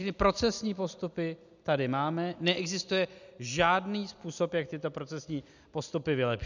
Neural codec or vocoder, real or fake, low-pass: none; real; 7.2 kHz